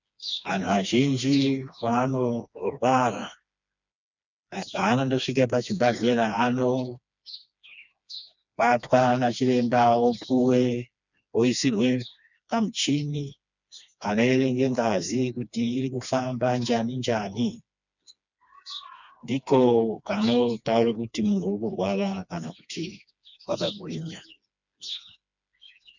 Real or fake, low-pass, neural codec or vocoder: fake; 7.2 kHz; codec, 16 kHz, 2 kbps, FreqCodec, smaller model